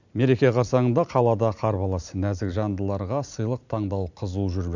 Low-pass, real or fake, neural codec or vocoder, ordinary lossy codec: 7.2 kHz; fake; vocoder, 44.1 kHz, 128 mel bands every 256 samples, BigVGAN v2; none